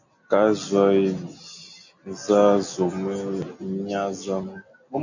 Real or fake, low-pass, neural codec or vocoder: real; 7.2 kHz; none